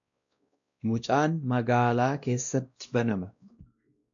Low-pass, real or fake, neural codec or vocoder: 7.2 kHz; fake; codec, 16 kHz, 1 kbps, X-Codec, WavLM features, trained on Multilingual LibriSpeech